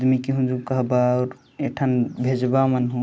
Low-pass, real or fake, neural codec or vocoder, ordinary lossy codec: none; real; none; none